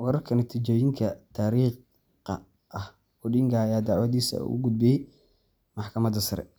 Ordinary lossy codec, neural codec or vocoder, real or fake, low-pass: none; none; real; none